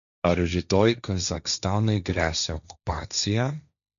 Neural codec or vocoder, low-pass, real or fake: codec, 16 kHz, 1.1 kbps, Voila-Tokenizer; 7.2 kHz; fake